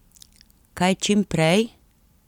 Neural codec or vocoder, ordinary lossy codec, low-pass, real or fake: none; none; 19.8 kHz; real